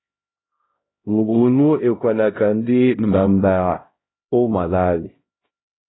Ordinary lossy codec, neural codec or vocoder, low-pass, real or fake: AAC, 16 kbps; codec, 16 kHz, 0.5 kbps, X-Codec, HuBERT features, trained on LibriSpeech; 7.2 kHz; fake